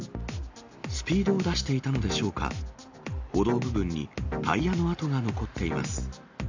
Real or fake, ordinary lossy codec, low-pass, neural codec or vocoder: real; AAC, 48 kbps; 7.2 kHz; none